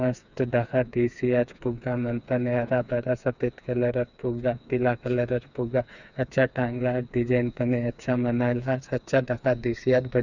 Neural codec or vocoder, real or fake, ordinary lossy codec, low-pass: codec, 16 kHz, 4 kbps, FreqCodec, smaller model; fake; none; 7.2 kHz